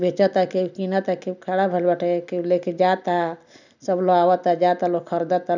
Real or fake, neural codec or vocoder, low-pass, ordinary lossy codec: real; none; 7.2 kHz; none